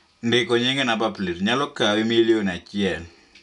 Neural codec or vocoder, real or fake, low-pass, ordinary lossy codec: none; real; 10.8 kHz; none